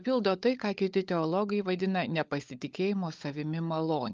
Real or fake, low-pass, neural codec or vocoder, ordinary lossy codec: fake; 7.2 kHz; codec, 16 kHz, 16 kbps, FunCodec, trained on LibriTTS, 50 frames a second; Opus, 24 kbps